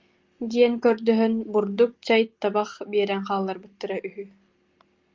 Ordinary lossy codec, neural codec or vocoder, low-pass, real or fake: Opus, 32 kbps; none; 7.2 kHz; real